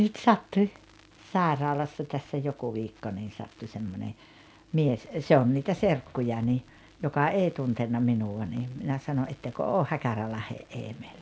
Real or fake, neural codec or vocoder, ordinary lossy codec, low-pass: real; none; none; none